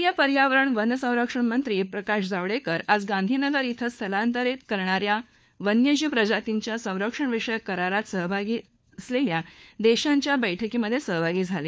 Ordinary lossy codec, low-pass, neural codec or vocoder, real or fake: none; none; codec, 16 kHz, 4 kbps, FunCodec, trained on LibriTTS, 50 frames a second; fake